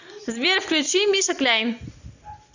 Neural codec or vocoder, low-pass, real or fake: none; 7.2 kHz; real